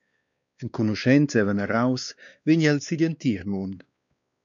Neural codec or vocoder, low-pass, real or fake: codec, 16 kHz, 2 kbps, X-Codec, WavLM features, trained on Multilingual LibriSpeech; 7.2 kHz; fake